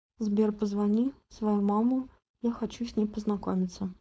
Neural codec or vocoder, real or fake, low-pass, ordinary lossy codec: codec, 16 kHz, 4.8 kbps, FACodec; fake; none; none